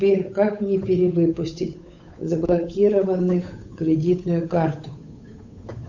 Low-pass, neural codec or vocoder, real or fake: 7.2 kHz; codec, 16 kHz, 8 kbps, FunCodec, trained on Chinese and English, 25 frames a second; fake